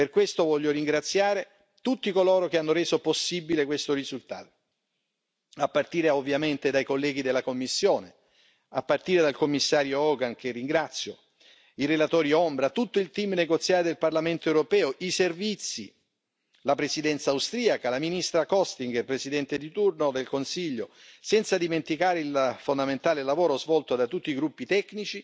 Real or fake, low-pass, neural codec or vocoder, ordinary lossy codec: real; none; none; none